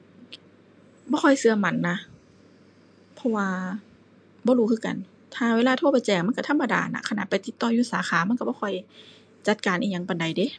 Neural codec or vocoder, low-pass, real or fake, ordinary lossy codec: none; 9.9 kHz; real; MP3, 64 kbps